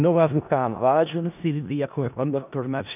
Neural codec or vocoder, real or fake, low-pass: codec, 16 kHz in and 24 kHz out, 0.4 kbps, LongCat-Audio-Codec, four codebook decoder; fake; 3.6 kHz